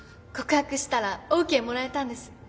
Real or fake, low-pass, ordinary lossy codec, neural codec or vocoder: real; none; none; none